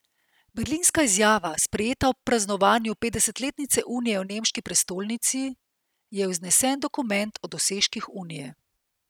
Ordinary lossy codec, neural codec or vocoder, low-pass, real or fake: none; none; none; real